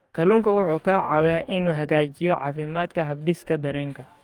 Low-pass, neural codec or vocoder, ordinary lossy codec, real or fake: 19.8 kHz; codec, 44.1 kHz, 2.6 kbps, DAC; Opus, 32 kbps; fake